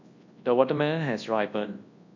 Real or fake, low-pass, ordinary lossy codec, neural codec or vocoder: fake; 7.2 kHz; MP3, 48 kbps; codec, 24 kHz, 0.9 kbps, WavTokenizer, large speech release